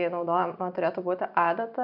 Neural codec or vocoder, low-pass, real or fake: vocoder, 44.1 kHz, 128 mel bands every 256 samples, BigVGAN v2; 5.4 kHz; fake